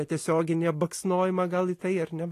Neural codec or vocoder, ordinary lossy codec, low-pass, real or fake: none; AAC, 48 kbps; 14.4 kHz; real